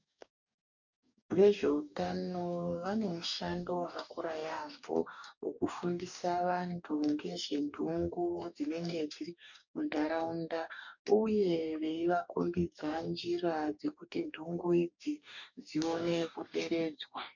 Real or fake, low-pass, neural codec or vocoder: fake; 7.2 kHz; codec, 44.1 kHz, 2.6 kbps, DAC